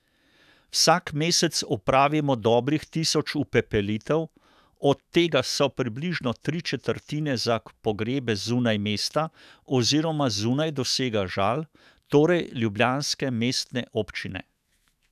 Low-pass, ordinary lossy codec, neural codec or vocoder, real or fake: 14.4 kHz; none; autoencoder, 48 kHz, 128 numbers a frame, DAC-VAE, trained on Japanese speech; fake